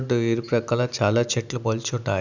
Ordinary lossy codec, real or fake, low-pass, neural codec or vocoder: none; real; 7.2 kHz; none